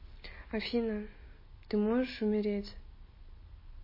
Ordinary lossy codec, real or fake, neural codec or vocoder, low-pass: MP3, 24 kbps; real; none; 5.4 kHz